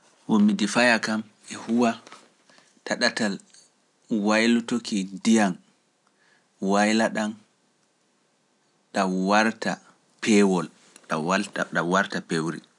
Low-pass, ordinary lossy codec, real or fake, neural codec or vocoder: 10.8 kHz; none; real; none